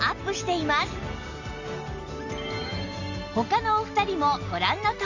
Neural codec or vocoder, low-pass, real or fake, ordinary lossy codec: none; 7.2 kHz; real; Opus, 64 kbps